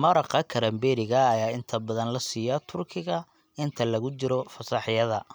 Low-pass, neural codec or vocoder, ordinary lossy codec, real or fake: none; none; none; real